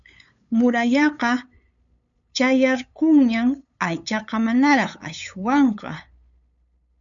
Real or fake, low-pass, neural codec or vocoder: fake; 7.2 kHz; codec, 16 kHz, 8 kbps, FunCodec, trained on LibriTTS, 25 frames a second